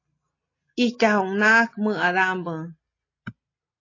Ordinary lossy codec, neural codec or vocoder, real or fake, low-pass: AAC, 32 kbps; none; real; 7.2 kHz